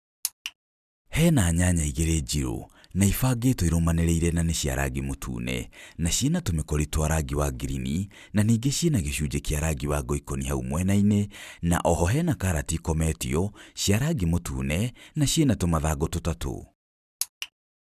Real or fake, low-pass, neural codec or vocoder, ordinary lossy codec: real; 14.4 kHz; none; none